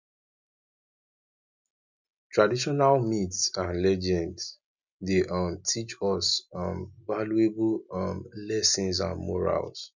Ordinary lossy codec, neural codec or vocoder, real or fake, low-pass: none; none; real; 7.2 kHz